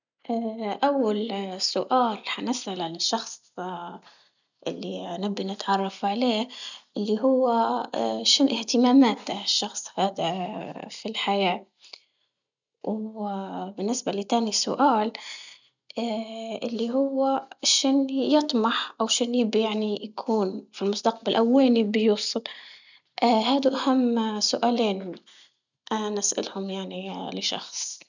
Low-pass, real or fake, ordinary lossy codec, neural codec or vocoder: 7.2 kHz; real; none; none